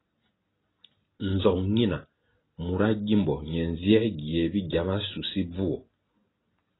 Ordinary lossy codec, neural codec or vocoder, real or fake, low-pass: AAC, 16 kbps; none; real; 7.2 kHz